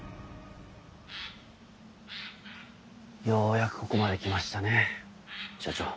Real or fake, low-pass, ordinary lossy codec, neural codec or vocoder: real; none; none; none